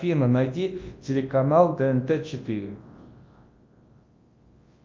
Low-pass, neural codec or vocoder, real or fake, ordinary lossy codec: 7.2 kHz; codec, 24 kHz, 0.9 kbps, WavTokenizer, large speech release; fake; Opus, 24 kbps